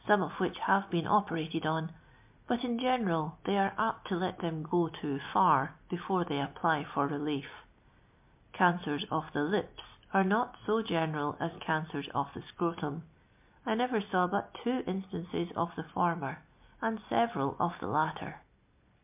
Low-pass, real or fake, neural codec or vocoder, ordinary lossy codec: 3.6 kHz; real; none; MP3, 32 kbps